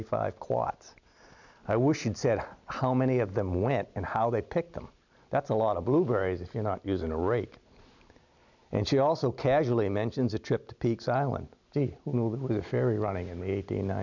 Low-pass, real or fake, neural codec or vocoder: 7.2 kHz; real; none